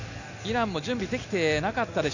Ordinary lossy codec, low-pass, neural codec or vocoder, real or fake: none; 7.2 kHz; none; real